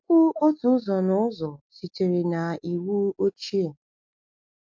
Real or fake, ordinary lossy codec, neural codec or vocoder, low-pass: real; MP3, 48 kbps; none; 7.2 kHz